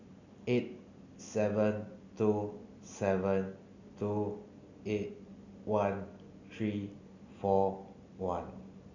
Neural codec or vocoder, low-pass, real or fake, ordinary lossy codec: none; 7.2 kHz; real; none